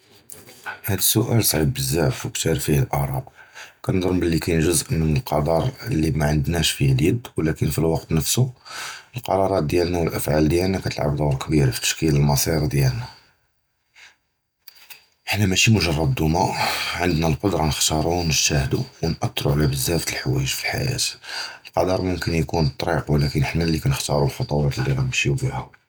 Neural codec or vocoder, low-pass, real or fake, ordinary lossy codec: none; none; real; none